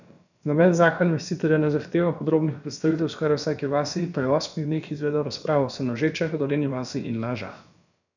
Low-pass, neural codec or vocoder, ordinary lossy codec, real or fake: 7.2 kHz; codec, 16 kHz, about 1 kbps, DyCAST, with the encoder's durations; none; fake